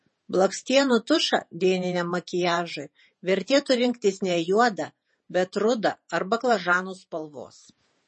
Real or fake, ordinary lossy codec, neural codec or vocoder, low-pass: fake; MP3, 32 kbps; vocoder, 48 kHz, 128 mel bands, Vocos; 10.8 kHz